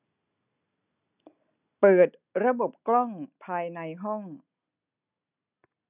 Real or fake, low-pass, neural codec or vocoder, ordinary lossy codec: real; 3.6 kHz; none; none